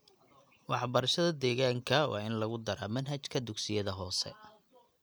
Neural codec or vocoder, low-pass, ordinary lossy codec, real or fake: none; none; none; real